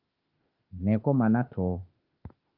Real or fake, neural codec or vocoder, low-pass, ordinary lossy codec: fake; autoencoder, 48 kHz, 32 numbers a frame, DAC-VAE, trained on Japanese speech; 5.4 kHz; Opus, 32 kbps